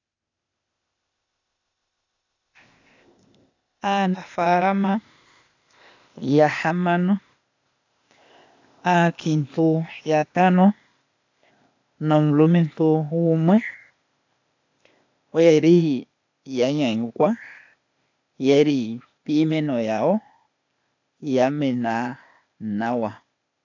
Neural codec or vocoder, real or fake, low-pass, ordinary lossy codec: codec, 16 kHz, 0.8 kbps, ZipCodec; fake; 7.2 kHz; AAC, 48 kbps